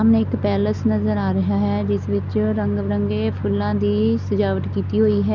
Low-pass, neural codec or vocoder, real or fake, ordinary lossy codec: 7.2 kHz; none; real; none